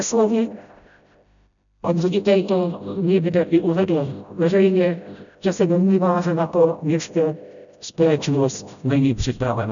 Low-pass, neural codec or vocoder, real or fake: 7.2 kHz; codec, 16 kHz, 0.5 kbps, FreqCodec, smaller model; fake